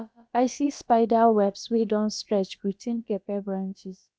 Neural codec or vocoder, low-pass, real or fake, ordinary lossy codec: codec, 16 kHz, about 1 kbps, DyCAST, with the encoder's durations; none; fake; none